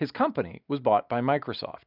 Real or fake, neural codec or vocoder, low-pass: real; none; 5.4 kHz